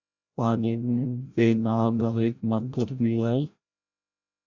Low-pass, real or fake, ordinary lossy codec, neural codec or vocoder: 7.2 kHz; fake; Opus, 64 kbps; codec, 16 kHz, 0.5 kbps, FreqCodec, larger model